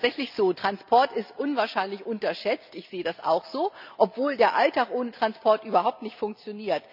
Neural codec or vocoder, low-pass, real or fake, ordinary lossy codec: none; 5.4 kHz; real; none